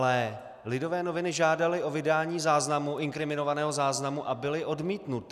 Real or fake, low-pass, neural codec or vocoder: real; 14.4 kHz; none